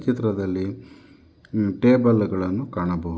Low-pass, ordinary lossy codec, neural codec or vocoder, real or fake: none; none; none; real